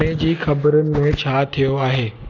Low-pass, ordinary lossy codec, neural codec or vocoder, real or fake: 7.2 kHz; none; none; real